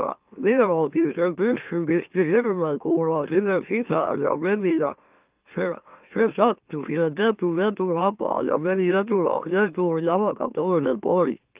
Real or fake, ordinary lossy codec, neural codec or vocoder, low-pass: fake; Opus, 24 kbps; autoencoder, 44.1 kHz, a latent of 192 numbers a frame, MeloTTS; 3.6 kHz